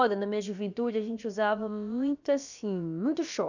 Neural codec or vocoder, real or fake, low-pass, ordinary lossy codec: codec, 16 kHz, about 1 kbps, DyCAST, with the encoder's durations; fake; 7.2 kHz; none